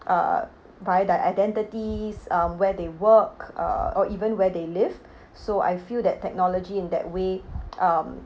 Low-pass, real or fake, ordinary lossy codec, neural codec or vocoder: none; real; none; none